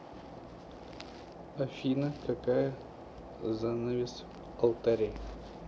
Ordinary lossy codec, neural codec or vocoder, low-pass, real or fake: none; none; none; real